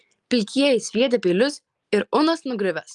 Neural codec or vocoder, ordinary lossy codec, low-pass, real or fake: none; Opus, 32 kbps; 10.8 kHz; real